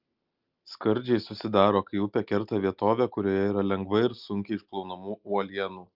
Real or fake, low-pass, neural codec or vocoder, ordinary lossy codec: real; 5.4 kHz; none; Opus, 24 kbps